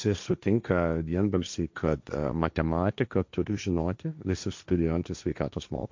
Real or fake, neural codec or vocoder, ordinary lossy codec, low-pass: fake; codec, 16 kHz, 1.1 kbps, Voila-Tokenizer; MP3, 64 kbps; 7.2 kHz